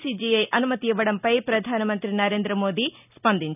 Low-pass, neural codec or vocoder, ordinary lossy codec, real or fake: 3.6 kHz; none; none; real